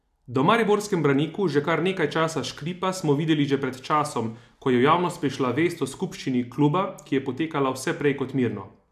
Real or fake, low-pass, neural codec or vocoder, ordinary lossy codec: real; 14.4 kHz; none; AAC, 96 kbps